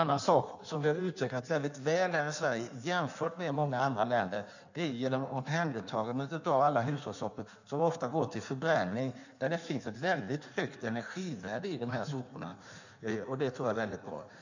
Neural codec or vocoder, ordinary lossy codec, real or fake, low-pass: codec, 16 kHz in and 24 kHz out, 1.1 kbps, FireRedTTS-2 codec; none; fake; 7.2 kHz